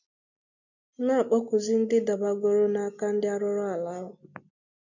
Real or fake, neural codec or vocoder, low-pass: real; none; 7.2 kHz